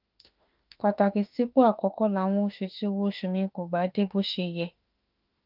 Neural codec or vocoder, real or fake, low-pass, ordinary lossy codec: autoencoder, 48 kHz, 32 numbers a frame, DAC-VAE, trained on Japanese speech; fake; 5.4 kHz; Opus, 32 kbps